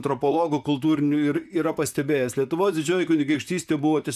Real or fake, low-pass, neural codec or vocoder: fake; 14.4 kHz; vocoder, 44.1 kHz, 128 mel bands, Pupu-Vocoder